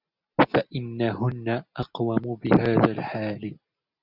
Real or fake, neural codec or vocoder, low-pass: real; none; 5.4 kHz